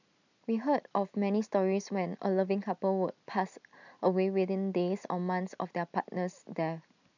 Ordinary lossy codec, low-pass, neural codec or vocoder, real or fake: none; 7.2 kHz; none; real